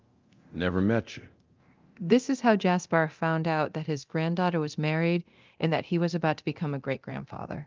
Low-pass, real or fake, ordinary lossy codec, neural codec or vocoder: 7.2 kHz; fake; Opus, 32 kbps; codec, 24 kHz, 0.9 kbps, DualCodec